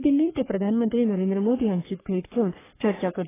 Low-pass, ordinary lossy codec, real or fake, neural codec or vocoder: 3.6 kHz; AAC, 16 kbps; fake; codec, 44.1 kHz, 3.4 kbps, Pupu-Codec